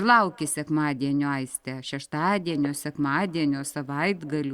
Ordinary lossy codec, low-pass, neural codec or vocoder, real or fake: Opus, 64 kbps; 19.8 kHz; none; real